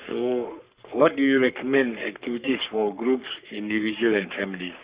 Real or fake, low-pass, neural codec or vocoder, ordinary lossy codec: fake; 3.6 kHz; codec, 44.1 kHz, 3.4 kbps, Pupu-Codec; Opus, 64 kbps